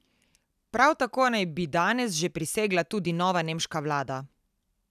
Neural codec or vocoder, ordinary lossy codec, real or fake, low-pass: none; none; real; 14.4 kHz